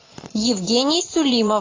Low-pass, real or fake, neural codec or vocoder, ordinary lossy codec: 7.2 kHz; fake; vocoder, 22.05 kHz, 80 mel bands, Vocos; AAC, 32 kbps